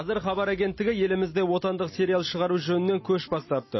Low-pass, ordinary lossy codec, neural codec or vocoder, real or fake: 7.2 kHz; MP3, 24 kbps; none; real